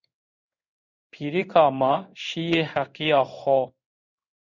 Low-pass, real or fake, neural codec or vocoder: 7.2 kHz; fake; codec, 16 kHz in and 24 kHz out, 1 kbps, XY-Tokenizer